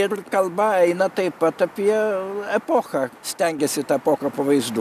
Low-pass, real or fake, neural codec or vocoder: 14.4 kHz; real; none